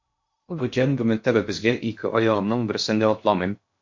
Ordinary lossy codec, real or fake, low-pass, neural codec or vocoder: MP3, 48 kbps; fake; 7.2 kHz; codec, 16 kHz in and 24 kHz out, 0.6 kbps, FocalCodec, streaming, 2048 codes